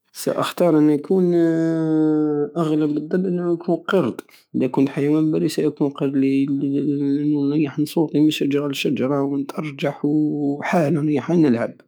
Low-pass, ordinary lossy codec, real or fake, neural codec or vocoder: none; none; fake; autoencoder, 48 kHz, 128 numbers a frame, DAC-VAE, trained on Japanese speech